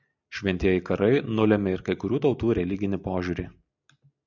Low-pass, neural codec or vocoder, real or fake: 7.2 kHz; none; real